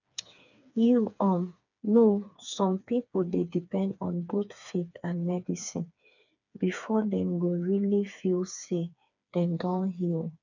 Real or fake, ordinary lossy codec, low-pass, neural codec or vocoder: fake; none; 7.2 kHz; codec, 16 kHz, 4 kbps, FreqCodec, smaller model